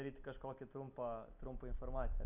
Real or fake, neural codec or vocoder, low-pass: real; none; 3.6 kHz